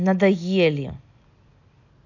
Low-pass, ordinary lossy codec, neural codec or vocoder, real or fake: 7.2 kHz; none; none; real